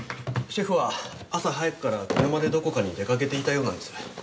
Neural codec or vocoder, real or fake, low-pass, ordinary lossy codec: none; real; none; none